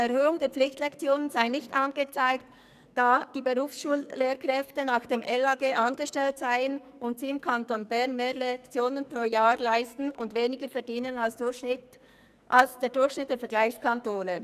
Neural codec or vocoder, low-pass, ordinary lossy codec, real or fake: codec, 44.1 kHz, 2.6 kbps, SNAC; 14.4 kHz; none; fake